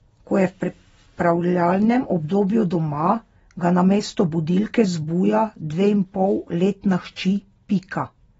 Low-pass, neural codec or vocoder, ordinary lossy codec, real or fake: 19.8 kHz; none; AAC, 24 kbps; real